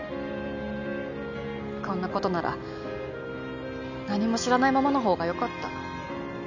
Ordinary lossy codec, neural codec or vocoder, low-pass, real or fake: none; none; 7.2 kHz; real